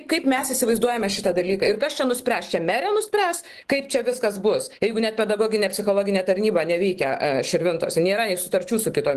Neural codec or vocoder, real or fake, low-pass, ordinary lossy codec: autoencoder, 48 kHz, 128 numbers a frame, DAC-VAE, trained on Japanese speech; fake; 14.4 kHz; Opus, 16 kbps